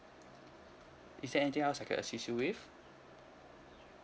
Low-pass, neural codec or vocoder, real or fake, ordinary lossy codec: none; none; real; none